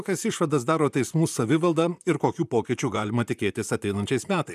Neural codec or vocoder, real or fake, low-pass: vocoder, 44.1 kHz, 128 mel bands, Pupu-Vocoder; fake; 14.4 kHz